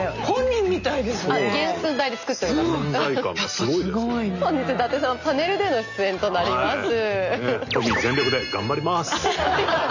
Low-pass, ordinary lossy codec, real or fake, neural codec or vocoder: 7.2 kHz; none; real; none